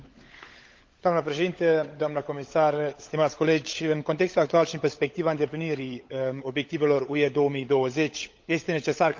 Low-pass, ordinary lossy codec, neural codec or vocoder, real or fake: 7.2 kHz; Opus, 32 kbps; codec, 16 kHz, 16 kbps, FunCodec, trained on LibriTTS, 50 frames a second; fake